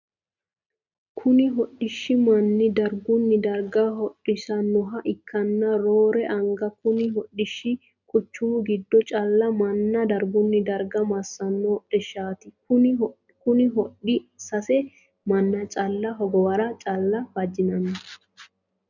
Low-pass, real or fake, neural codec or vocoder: 7.2 kHz; real; none